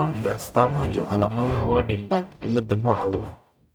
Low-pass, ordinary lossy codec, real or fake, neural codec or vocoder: none; none; fake; codec, 44.1 kHz, 0.9 kbps, DAC